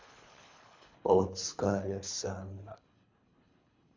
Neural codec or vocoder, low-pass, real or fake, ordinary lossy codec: codec, 24 kHz, 3 kbps, HILCodec; 7.2 kHz; fake; MP3, 64 kbps